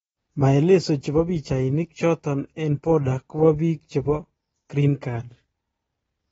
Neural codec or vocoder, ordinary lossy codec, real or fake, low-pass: none; AAC, 24 kbps; real; 19.8 kHz